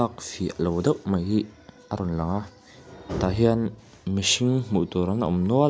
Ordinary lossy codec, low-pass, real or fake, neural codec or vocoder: none; none; real; none